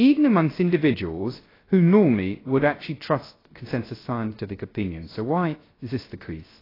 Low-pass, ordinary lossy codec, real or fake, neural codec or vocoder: 5.4 kHz; AAC, 24 kbps; fake; codec, 16 kHz, 0.3 kbps, FocalCodec